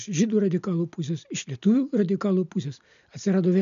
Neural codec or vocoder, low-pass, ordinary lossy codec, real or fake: none; 7.2 kHz; MP3, 96 kbps; real